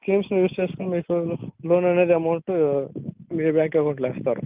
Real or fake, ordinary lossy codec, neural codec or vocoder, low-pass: real; Opus, 32 kbps; none; 3.6 kHz